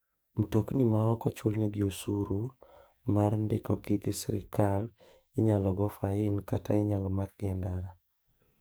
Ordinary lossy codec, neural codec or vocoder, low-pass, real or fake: none; codec, 44.1 kHz, 2.6 kbps, SNAC; none; fake